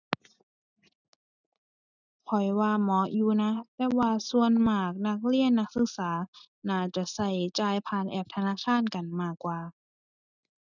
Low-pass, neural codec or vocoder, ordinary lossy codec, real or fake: 7.2 kHz; none; none; real